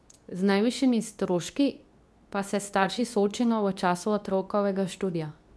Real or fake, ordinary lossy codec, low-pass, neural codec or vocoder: fake; none; none; codec, 24 kHz, 0.9 kbps, WavTokenizer, medium speech release version 2